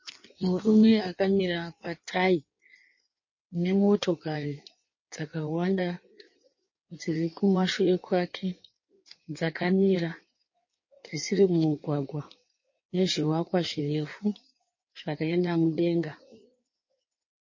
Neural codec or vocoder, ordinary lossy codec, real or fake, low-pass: codec, 16 kHz in and 24 kHz out, 1.1 kbps, FireRedTTS-2 codec; MP3, 32 kbps; fake; 7.2 kHz